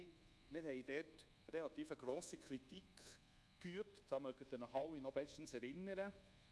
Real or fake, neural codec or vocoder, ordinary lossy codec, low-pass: fake; codec, 24 kHz, 1.2 kbps, DualCodec; none; none